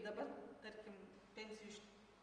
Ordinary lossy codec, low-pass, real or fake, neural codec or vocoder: Opus, 32 kbps; 9.9 kHz; real; none